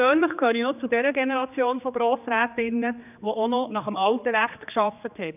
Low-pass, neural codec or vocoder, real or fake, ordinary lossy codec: 3.6 kHz; codec, 16 kHz, 2 kbps, X-Codec, HuBERT features, trained on general audio; fake; none